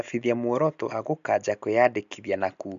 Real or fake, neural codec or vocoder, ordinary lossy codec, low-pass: real; none; MP3, 64 kbps; 7.2 kHz